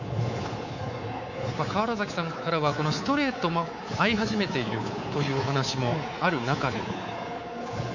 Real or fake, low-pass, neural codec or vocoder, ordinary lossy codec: fake; 7.2 kHz; codec, 24 kHz, 3.1 kbps, DualCodec; none